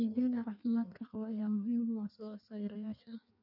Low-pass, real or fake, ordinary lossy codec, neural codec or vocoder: 5.4 kHz; fake; none; codec, 16 kHz in and 24 kHz out, 1.1 kbps, FireRedTTS-2 codec